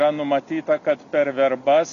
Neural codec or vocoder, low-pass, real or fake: none; 7.2 kHz; real